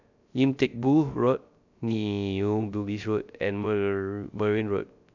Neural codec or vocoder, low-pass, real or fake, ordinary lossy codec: codec, 16 kHz, 0.3 kbps, FocalCodec; 7.2 kHz; fake; none